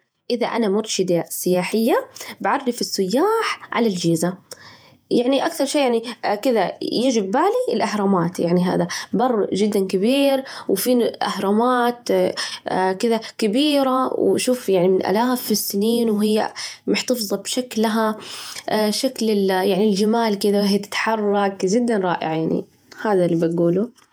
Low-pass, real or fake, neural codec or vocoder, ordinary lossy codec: none; fake; vocoder, 48 kHz, 128 mel bands, Vocos; none